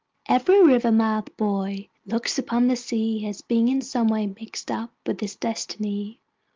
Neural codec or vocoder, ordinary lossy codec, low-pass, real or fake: none; Opus, 24 kbps; 7.2 kHz; real